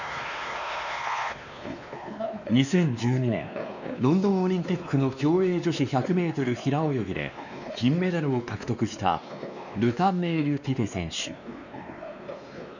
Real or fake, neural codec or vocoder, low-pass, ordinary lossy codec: fake; codec, 16 kHz, 2 kbps, X-Codec, WavLM features, trained on Multilingual LibriSpeech; 7.2 kHz; none